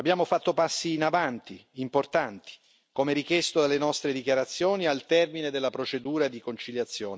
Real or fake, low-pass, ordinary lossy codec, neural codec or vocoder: real; none; none; none